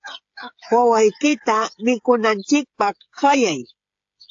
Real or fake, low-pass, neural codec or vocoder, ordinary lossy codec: fake; 7.2 kHz; codec, 16 kHz, 8 kbps, FreqCodec, smaller model; AAC, 48 kbps